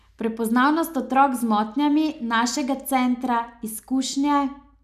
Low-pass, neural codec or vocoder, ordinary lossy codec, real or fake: 14.4 kHz; none; none; real